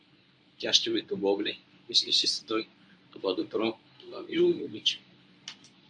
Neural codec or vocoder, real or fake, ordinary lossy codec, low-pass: codec, 24 kHz, 0.9 kbps, WavTokenizer, medium speech release version 1; fake; AAC, 64 kbps; 9.9 kHz